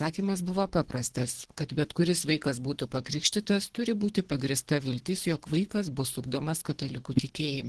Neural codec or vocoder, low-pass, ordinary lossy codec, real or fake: codec, 24 kHz, 3 kbps, HILCodec; 10.8 kHz; Opus, 16 kbps; fake